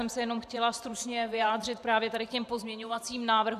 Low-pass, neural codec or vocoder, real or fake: 14.4 kHz; vocoder, 44.1 kHz, 128 mel bands every 512 samples, BigVGAN v2; fake